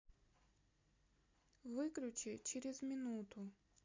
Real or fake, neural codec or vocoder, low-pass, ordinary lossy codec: real; none; 7.2 kHz; MP3, 48 kbps